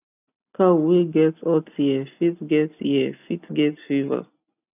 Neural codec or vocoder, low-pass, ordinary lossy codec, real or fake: none; 3.6 kHz; none; real